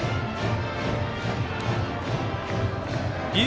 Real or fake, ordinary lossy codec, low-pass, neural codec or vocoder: real; none; none; none